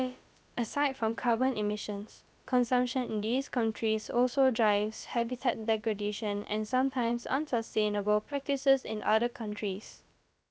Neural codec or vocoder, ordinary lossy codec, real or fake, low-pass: codec, 16 kHz, about 1 kbps, DyCAST, with the encoder's durations; none; fake; none